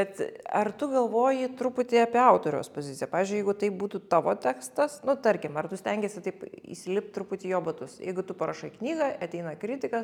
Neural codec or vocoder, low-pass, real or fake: none; 19.8 kHz; real